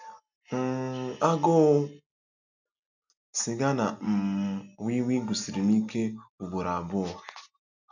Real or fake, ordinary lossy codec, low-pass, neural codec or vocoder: real; none; 7.2 kHz; none